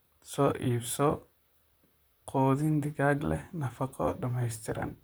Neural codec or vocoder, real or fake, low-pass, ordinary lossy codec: vocoder, 44.1 kHz, 128 mel bands, Pupu-Vocoder; fake; none; none